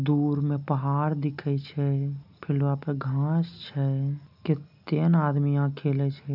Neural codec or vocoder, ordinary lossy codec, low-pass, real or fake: none; none; 5.4 kHz; real